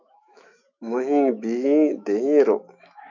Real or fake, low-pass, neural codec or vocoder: fake; 7.2 kHz; autoencoder, 48 kHz, 128 numbers a frame, DAC-VAE, trained on Japanese speech